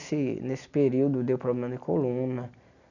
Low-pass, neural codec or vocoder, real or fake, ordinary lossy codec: 7.2 kHz; none; real; none